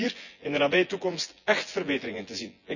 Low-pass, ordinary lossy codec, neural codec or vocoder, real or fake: 7.2 kHz; none; vocoder, 24 kHz, 100 mel bands, Vocos; fake